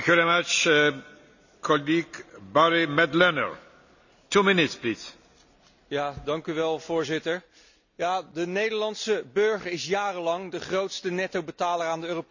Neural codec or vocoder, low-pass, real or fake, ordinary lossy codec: none; 7.2 kHz; real; none